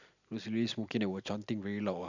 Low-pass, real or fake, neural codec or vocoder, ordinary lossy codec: 7.2 kHz; real; none; none